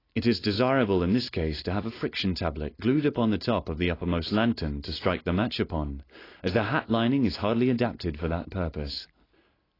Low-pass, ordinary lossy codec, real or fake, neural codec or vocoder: 5.4 kHz; AAC, 24 kbps; real; none